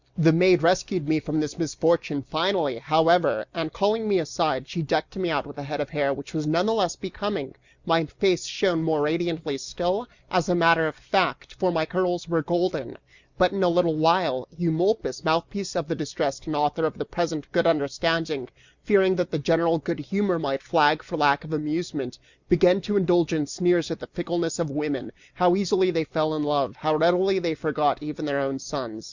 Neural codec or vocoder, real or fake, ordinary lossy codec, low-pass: none; real; Opus, 64 kbps; 7.2 kHz